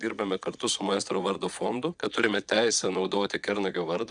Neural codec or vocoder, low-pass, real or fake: vocoder, 22.05 kHz, 80 mel bands, Vocos; 9.9 kHz; fake